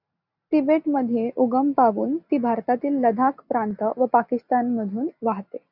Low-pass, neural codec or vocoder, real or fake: 5.4 kHz; none; real